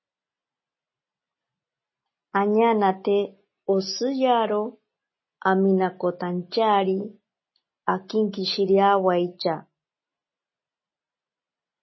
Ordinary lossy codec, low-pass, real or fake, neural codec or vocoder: MP3, 24 kbps; 7.2 kHz; real; none